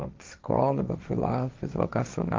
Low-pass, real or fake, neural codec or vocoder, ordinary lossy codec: 7.2 kHz; fake; codec, 16 kHz, 1.1 kbps, Voila-Tokenizer; Opus, 24 kbps